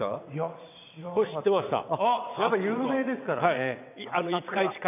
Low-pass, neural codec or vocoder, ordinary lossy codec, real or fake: 3.6 kHz; vocoder, 22.05 kHz, 80 mel bands, WaveNeXt; none; fake